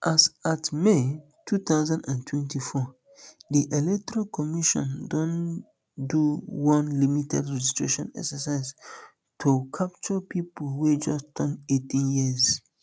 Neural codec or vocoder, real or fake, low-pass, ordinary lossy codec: none; real; none; none